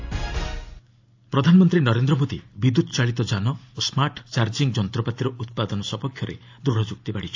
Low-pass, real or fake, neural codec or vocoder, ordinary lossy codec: 7.2 kHz; real; none; AAC, 48 kbps